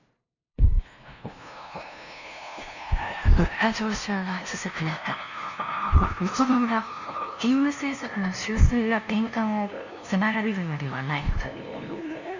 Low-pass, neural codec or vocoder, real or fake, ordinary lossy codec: 7.2 kHz; codec, 16 kHz, 0.5 kbps, FunCodec, trained on LibriTTS, 25 frames a second; fake; none